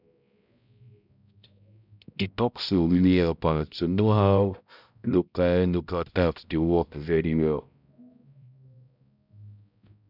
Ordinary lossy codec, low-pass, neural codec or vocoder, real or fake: none; 5.4 kHz; codec, 16 kHz, 0.5 kbps, X-Codec, HuBERT features, trained on balanced general audio; fake